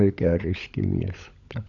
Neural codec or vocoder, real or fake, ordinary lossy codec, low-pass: codec, 16 kHz, 16 kbps, FunCodec, trained on LibriTTS, 50 frames a second; fake; none; 7.2 kHz